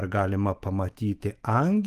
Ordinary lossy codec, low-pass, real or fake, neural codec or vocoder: Opus, 24 kbps; 14.4 kHz; fake; autoencoder, 48 kHz, 128 numbers a frame, DAC-VAE, trained on Japanese speech